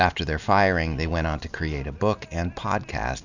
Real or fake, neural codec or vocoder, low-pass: real; none; 7.2 kHz